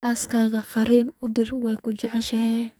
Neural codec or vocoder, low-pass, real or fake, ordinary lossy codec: codec, 44.1 kHz, 2.6 kbps, SNAC; none; fake; none